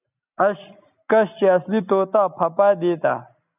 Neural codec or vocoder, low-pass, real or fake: none; 3.6 kHz; real